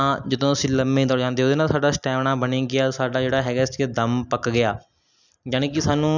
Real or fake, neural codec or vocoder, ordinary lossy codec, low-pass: real; none; none; 7.2 kHz